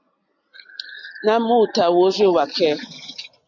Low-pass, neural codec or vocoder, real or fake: 7.2 kHz; none; real